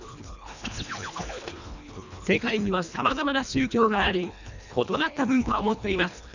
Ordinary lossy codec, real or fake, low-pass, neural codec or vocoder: none; fake; 7.2 kHz; codec, 24 kHz, 1.5 kbps, HILCodec